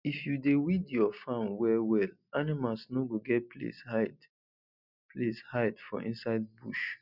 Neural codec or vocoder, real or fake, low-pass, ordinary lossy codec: none; real; 5.4 kHz; none